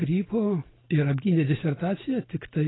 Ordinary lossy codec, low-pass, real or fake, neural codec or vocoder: AAC, 16 kbps; 7.2 kHz; real; none